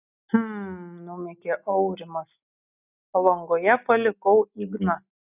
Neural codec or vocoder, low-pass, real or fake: none; 3.6 kHz; real